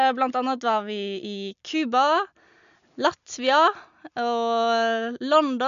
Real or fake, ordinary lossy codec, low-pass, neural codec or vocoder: real; none; 7.2 kHz; none